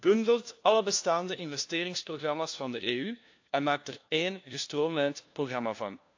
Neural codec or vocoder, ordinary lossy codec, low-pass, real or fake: codec, 16 kHz, 1 kbps, FunCodec, trained on LibriTTS, 50 frames a second; AAC, 48 kbps; 7.2 kHz; fake